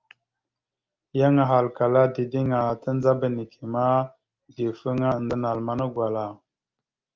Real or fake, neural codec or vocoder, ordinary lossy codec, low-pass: real; none; Opus, 32 kbps; 7.2 kHz